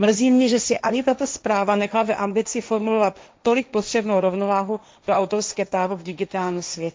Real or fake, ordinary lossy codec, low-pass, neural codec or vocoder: fake; none; none; codec, 16 kHz, 1.1 kbps, Voila-Tokenizer